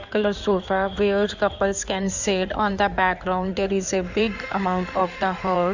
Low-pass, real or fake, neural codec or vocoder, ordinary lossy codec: 7.2 kHz; fake; codec, 16 kHz in and 24 kHz out, 2.2 kbps, FireRedTTS-2 codec; Opus, 64 kbps